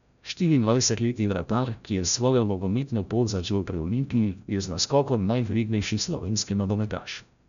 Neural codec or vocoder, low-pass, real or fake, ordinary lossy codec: codec, 16 kHz, 0.5 kbps, FreqCodec, larger model; 7.2 kHz; fake; none